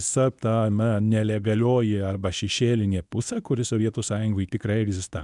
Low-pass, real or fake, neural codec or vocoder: 10.8 kHz; fake; codec, 24 kHz, 0.9 kbps, WavTokenizer, medium speech release version 1